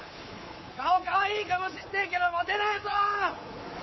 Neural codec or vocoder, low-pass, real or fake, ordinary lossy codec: codec, 24 kHz, 3.1 kbps, DualCodec; 7.2 kHz; fake; MP3, 24 kbps